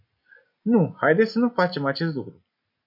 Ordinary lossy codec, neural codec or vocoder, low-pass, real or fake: MP3, 48 kbps; none; 5.4 kHz; real